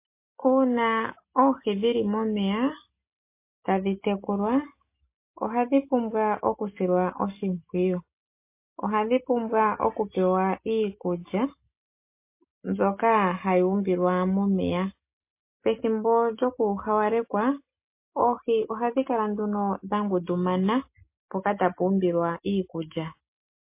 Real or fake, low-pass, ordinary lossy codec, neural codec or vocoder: real; 3.6 kHz; MP3, 24 kbps; none